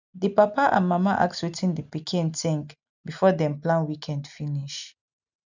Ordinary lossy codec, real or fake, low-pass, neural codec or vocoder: none; real; 7.2 kHz; none